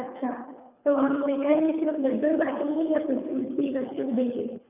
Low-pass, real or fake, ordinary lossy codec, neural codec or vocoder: 3.6 kHz; fake; none; codec, 24 kHz, 3 kbps, HILCodec